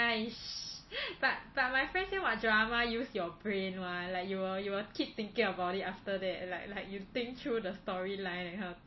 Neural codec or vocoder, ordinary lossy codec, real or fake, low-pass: none; MP3, 24 kbps; real; 7.2 kHz